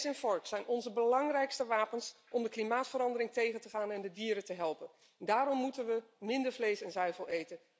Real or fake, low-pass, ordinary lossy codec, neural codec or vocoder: real; none; none; none